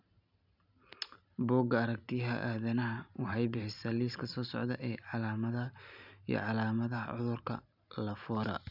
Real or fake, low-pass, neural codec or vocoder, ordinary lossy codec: real; 5.4 kHz; none; none